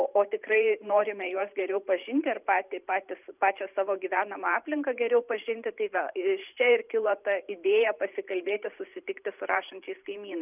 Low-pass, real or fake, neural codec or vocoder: 3.6 kHz; fake; vocoder, 44.1 kHz, 128 mel bands every 512 samples, BigVGAN v2